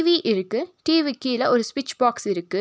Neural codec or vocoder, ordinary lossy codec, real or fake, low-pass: none; none; real; none